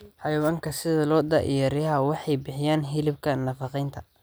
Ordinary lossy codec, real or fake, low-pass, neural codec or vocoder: none; real; none; none